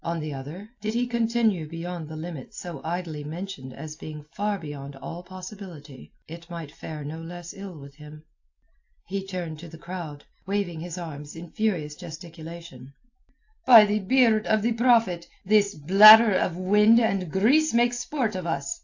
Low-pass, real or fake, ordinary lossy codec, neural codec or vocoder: 7.2 kHz; real; AAC, 48 kbps; none